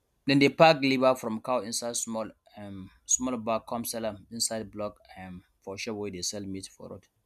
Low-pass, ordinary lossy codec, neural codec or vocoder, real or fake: 14.4 kHz; MP3, 96 kbps; none; real